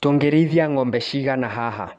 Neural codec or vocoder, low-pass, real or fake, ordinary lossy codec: vocoder, 24 kHz, 100 mel bands, Vocos; none; fake; none